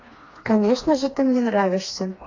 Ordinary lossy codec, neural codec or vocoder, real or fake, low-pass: AAC, 32 kbps; codec, 16 kHz, 2 kbps, FreqCodec, smaller model; fake; 7.2 kHz